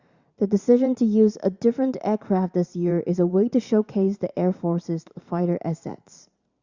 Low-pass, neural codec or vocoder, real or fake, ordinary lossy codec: 7.2 kHz; vocoder, 44.1 kHz, 128 mel bands every 512 samples, BigVGAN v2; fake; Opus, 64 kbps